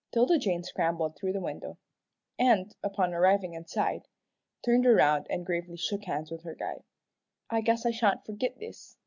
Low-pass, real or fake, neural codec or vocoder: 7.2 kHz; real; none